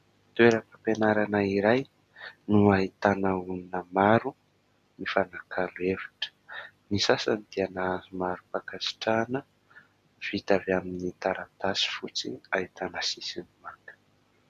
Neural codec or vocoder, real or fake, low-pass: none; real; 14.4 kHz